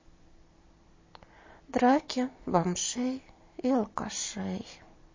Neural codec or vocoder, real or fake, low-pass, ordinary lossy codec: none; real; 7.2 kHz; MP3, 32 kbps